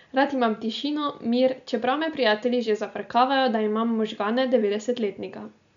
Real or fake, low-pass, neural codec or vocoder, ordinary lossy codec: real; 7.2 kHz; none; none